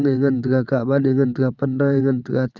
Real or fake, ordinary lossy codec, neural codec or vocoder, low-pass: fake; none; vocoder, 22.05 kHz, 80 mel bands, WaveNeXt; 7.2 kHz